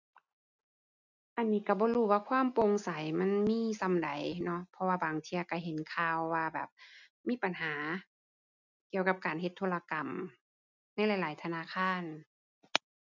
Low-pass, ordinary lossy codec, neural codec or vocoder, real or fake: 7.2 kHz; none; none; real